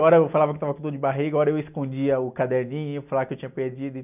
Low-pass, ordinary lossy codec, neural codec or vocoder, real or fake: 3.6 kHz; none; none; real